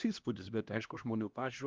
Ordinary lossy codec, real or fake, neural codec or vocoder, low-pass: Opus, 32 kbps; fake; codec, 16 kHz, 1 kbps, X-Codec, HuBERT features, trained on LibriSpeech; 7.2 kHz